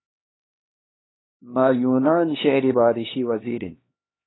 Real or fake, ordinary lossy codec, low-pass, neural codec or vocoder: fake; AAC, 16 kbps; 7.2 kHz; codec, 16 kHz, 2 kbps, X-Codec, HuBERT features, trained on LibriSpeech